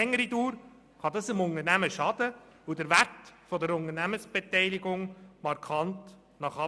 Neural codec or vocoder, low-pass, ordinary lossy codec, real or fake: none; none; none; real